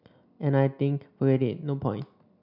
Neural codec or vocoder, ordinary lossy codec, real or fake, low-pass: none; none; real; 5.4 kHz